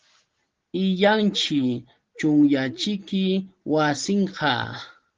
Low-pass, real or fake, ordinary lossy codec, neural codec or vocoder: 7.2 kHz; real; Opus, 16 kbps; none